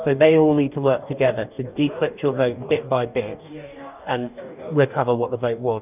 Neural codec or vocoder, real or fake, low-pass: codec, 44.1 kHz, 2.6 kbps, DAC; fake; 3.6 kHz